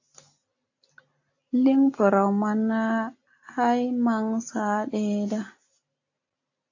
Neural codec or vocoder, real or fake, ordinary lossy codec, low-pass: none; real; AAC, 48 kbps; 7.2 kHz